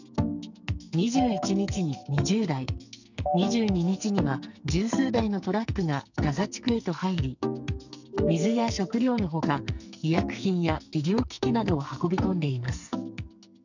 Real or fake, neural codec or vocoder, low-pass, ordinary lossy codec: fake; codec, 44.1 kHz, 2.6 kbps, SNAC; 7.2 kHz; none